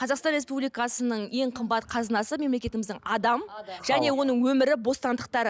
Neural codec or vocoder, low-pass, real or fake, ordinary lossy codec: none; none; real; none